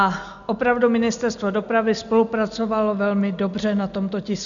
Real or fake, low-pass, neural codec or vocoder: real; 7.2 kHz; none